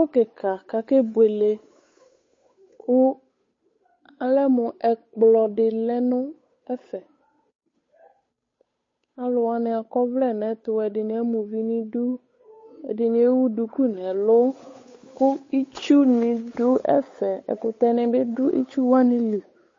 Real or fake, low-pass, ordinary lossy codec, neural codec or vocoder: fake; 7.2 kHz; MP3, 32 kbps; codec, 16 kHz, 8 kbps, FunCodec, trained on Chinese and English, 25 frames a second